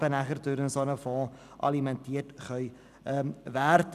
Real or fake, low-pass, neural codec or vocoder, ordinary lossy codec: real; 14.4 kHz; none; none